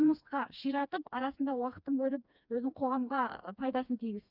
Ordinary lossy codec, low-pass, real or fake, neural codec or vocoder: none; 5.4 kHz; fake; codec, 16 kHz, 2 kbps, FreqCodec, smaller model